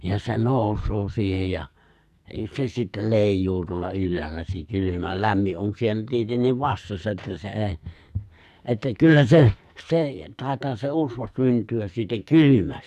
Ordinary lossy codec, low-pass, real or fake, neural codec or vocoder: none; 14.4 kHz; fake; codec, 44.1 kHz, 2.6 kbps, SNAC